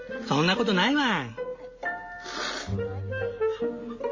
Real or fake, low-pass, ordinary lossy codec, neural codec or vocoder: real; 7.2 kHz; MP3, 32 kbps; none